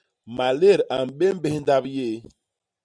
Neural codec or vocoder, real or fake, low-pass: none; real; 9.9 kHz